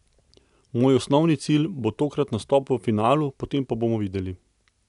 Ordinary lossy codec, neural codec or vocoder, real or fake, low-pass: none; none; real; 10.8 kHz